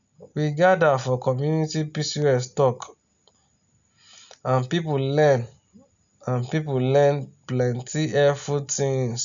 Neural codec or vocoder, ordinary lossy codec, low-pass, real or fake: none; none; 7.2 kHz; real